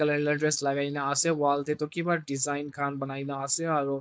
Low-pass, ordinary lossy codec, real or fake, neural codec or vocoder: none; none; fake; codec, 16 kHz, 4.8 kbps, FACodec